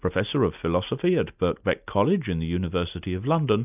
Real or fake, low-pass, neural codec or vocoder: real; 3.6 kHz; none